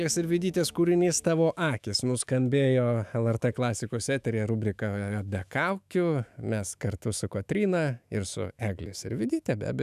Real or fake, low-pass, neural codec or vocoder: fake; 14.4 kHz; autoencoder, 48 kHz, 128 numbers a frame, DAC-VAE, trained on Japanese speech